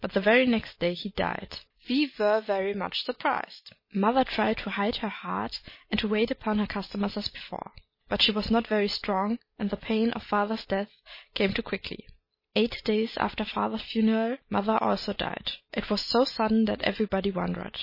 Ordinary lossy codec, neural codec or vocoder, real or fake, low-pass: MP3, 24 kbps; none; real; 5.4 kHz